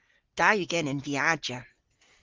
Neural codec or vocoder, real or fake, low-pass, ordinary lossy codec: none; real; 7.2 kHz; Opus, 16 kbps